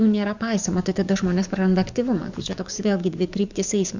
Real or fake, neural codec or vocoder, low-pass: fake; codec, 44.1 kHz, 7.8 kbps, DAC; 7.2 kHz